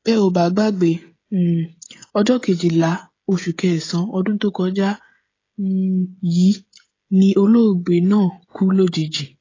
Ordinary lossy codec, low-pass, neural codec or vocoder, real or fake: AAC, 32 kbps; 7.2 kHz; codec, 16 kHz, 16 kbps, FreqCodec, smaller model; fake